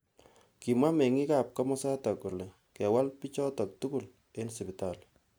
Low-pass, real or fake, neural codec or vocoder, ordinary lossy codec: none; real; none; none